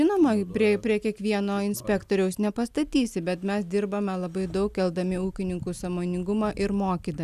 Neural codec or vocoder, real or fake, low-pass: none; real; 14.4 kHz